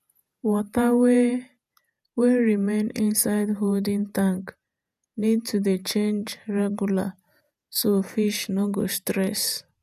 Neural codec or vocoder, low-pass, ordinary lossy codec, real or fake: vocoder, 48 kHz, 128 mel bands, Vocos; 14.4 kHz; none; fake